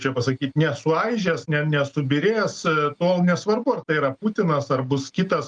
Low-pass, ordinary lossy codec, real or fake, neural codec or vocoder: 9.9 kHz; Opus, 32 kbps; real; none